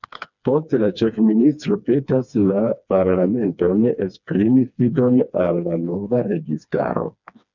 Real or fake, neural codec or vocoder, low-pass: fake; codec, 16 kHz, 2 kbps, FreqCodec, smaller model; 7.2 kHz